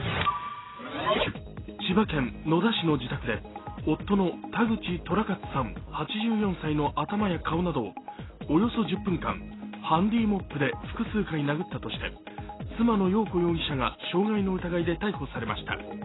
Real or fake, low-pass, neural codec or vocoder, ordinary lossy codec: real; 7.2 kHz; none; AAC, 16 kbps